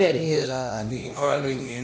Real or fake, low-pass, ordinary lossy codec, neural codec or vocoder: fake; none; none; codec, 16 kHz, 1 kbps, X-Codec, HuBERT features, trained on LibriSpeech